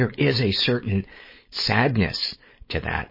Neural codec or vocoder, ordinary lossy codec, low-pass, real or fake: codec, 16 kHz, 4.8 kbps, FACodec; MP3, 24 kbps; 5.4 kHz; fake